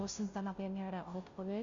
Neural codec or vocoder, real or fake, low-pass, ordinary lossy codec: codec, 16 kHz, 0.5 kbps, FunCodec, trained on Chinese and English, 25 frames a second; fake; 7.2 kHz; Opus, 64 kbps